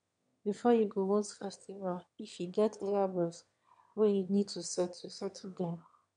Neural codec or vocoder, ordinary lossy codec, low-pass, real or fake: autoencoder, 22.05 kHz, a latent of 192 numbers a frame, VITS, trained on one speaker; none; 9.9 kHz; fake